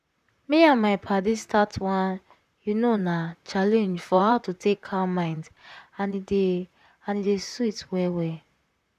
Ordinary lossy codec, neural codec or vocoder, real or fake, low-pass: none; vocoder, 44.1 kHz, 128 mel bands, Pupu-Vocoder; fake; 14.4 kHz